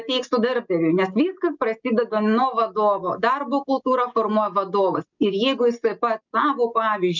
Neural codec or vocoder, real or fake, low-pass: none; real; 7.2 kHz